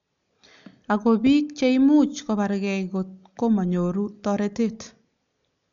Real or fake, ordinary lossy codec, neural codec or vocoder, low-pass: real; none; none; 7.2 kHz